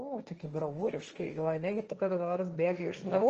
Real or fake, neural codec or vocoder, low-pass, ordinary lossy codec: fake; codec, 16 kHz, 1.1 kbps, Voila-Tokenizer; 7.2 kHz; Opus, 24 kbps